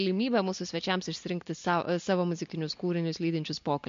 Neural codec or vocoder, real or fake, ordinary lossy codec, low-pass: none; real; MP3, 48 kbps; 7.2 kHz